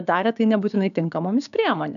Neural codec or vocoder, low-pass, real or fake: codec, 16 kHz, 6 kbps, DAC; 7.2 kHz; fake